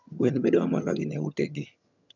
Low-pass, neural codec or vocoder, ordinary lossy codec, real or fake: 7.2 kHz; vocoder, 22.05 kHz, 80 mel bands, HiFi-GAN; none; fake